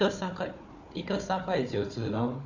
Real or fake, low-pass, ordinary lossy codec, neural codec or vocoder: fake; 7.2 kHz; none; codec, 16 kHz, 4 kbps, FunCodec, trained on Chinese and English, 50 frames a second